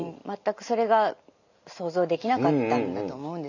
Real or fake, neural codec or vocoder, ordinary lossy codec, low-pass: real; none; none; 7.2 kHz